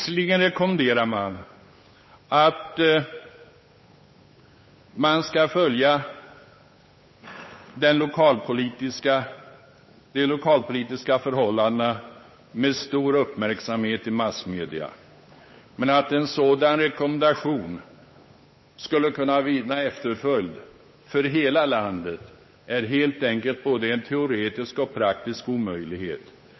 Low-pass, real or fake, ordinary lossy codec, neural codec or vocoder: 7.2 kHz; fake; MP3, 24 kbps; codec, 16 kHz, 8 kbps, FunCodec, trained on Chinese and English, 25 frames a second